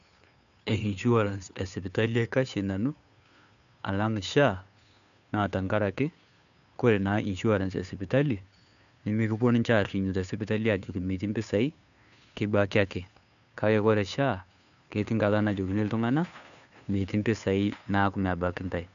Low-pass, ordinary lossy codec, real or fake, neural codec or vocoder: 7.2 kHz; none; fake; codec, 16 kHz, 2 kbps, FunCodec, trained on Chinese and English, 25 frames a second